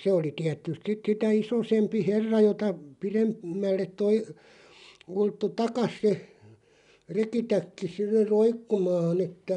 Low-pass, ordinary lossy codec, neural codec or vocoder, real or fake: 10.8 kHz; none; none; real